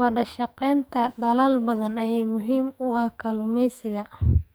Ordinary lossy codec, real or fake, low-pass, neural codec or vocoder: none; fake; none; codec, 44.1 kHz, 2.6 kbps, SNAC